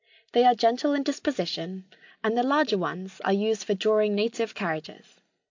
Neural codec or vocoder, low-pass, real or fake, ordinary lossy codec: none; 7.2 kHz; real; AAC, 48 kbps